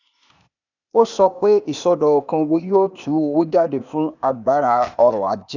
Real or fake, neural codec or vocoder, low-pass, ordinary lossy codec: fake; codec, 16 kHz, 0.8 kbps, ZipCodec; 7.2 kHz; none